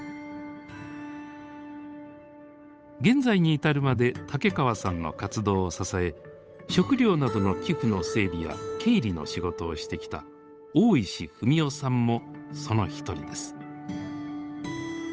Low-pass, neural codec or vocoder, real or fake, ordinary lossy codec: none; codec, 16 kHz, 8 kbps, FunCodec, trained on Chinese and English, 25 frames a second; fake; none